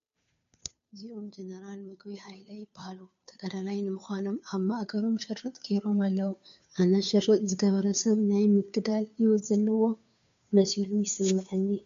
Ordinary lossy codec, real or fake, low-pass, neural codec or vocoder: MP3, 64 kbps; fake; 7.2 kHz; codec, 16 kHz, 2 kbps, FunCodec, trained on Chinese and English, 25 frames a second